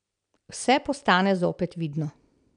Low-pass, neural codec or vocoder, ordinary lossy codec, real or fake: 9.9 kHz; none; none; real